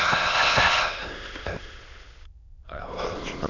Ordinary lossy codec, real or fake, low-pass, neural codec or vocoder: none; fake; 7.2 kHz; autoencoder, 22.05 kHz, a latent of 192 numbers a frame, VITS, trained on many speakers